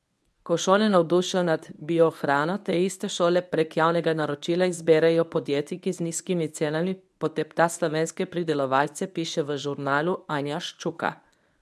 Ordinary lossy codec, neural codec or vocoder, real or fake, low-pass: none; codec, 24 kHz, 0.9 kbps, WavTokenizer, medium speech release version 1; fake; none